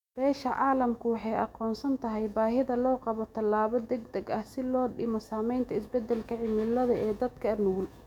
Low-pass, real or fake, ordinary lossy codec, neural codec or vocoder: 19.8 kHz; fake; none; autoencoder, 48 kHz, 128 numbers a frame, DAC-VAE, trained on Japanese speech